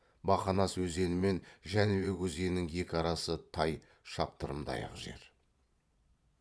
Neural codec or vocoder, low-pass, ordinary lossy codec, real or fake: vocoder, 22.05 kHz, 80 mel bands, Vocos; none; none; fake